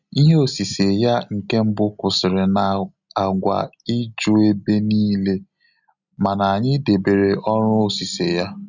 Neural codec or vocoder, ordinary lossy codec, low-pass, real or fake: none; none; 7.2 kHz; real